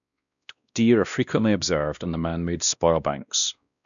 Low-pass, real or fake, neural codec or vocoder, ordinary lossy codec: 7.2 kHz; fake; codec, 16 kHz, 1 kbps, X-Codec, WavLM features, trained on Multilingual LibriSpeech; none